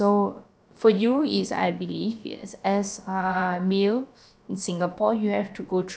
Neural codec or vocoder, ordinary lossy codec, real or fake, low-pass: codec, 16 kHz, about 1 kbps, DyCAST, with the encoder's durations; none; fake; none